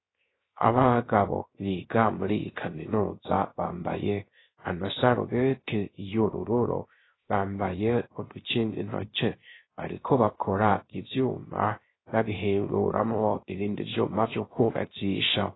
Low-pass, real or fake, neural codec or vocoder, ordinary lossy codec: 7.2 kHz; fake; codec, 16 kHz, 0.3 kbps, FocalCodec; AAC, 16 kbps